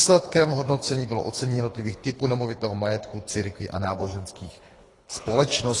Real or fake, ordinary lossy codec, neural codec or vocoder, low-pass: fake; AAC, 32 kbps; codec, 24 kHz, 3 kbps, HILCodec; 10.8 kHz